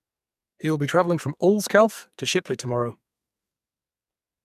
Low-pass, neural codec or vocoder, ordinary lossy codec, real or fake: 14.4 kHz; codec, 44.1 kHz, 2.6 kbps, SNAC; none; fake